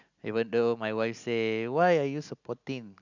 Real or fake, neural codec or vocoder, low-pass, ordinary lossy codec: real; none; 7.2 kHz; none